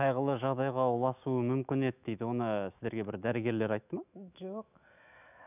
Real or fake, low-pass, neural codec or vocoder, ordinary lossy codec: fake; 3.6 kHz; autoencoder, 48 kHz, 128 numbers a frame, DAC-VAE, trained on Japanese speech; none